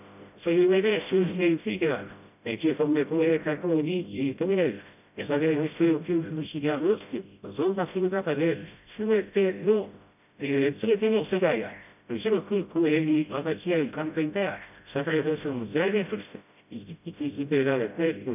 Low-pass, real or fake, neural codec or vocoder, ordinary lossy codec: 3.6 kHz; fake; codec, 16 kHz, 0.5 kbps, FreqCodec, smaller model; none